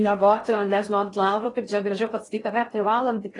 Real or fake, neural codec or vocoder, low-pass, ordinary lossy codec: fake; codec, 16 kHz in and 24 kHz out, 0.6 kbps, FocalCodec, streaming, 4096 codes; 10.8 kHz; AAC, 32 kbps